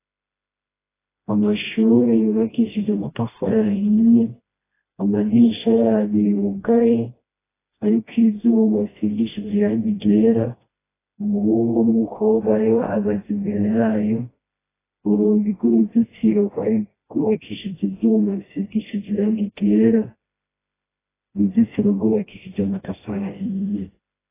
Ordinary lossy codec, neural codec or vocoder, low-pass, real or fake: AAC, 16 kbps; codec, 16 kHz, 1 kbps, FreqCodec, smaller model; 3.6 kHz; fake